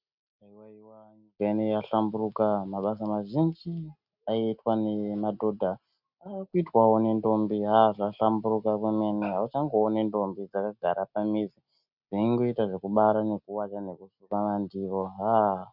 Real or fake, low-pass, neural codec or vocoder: real; 5.4 kHz; none